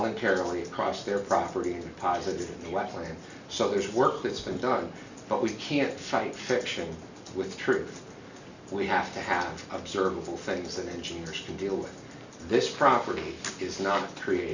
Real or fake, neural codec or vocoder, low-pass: real; none; 7.2 kHz